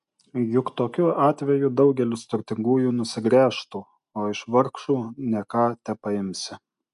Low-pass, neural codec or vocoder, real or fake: 10.8 kHz; none; real